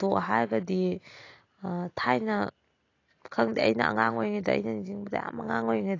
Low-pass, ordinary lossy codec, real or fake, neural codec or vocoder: 7.2 kHz; AAC, 32 kbps; real; none